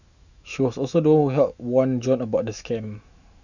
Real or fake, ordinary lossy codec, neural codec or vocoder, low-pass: fake; none; autoencoder, 48 kHz, 128 numbers a frame, DAC-VAE, trained on Japanese speech; 7.2 kHz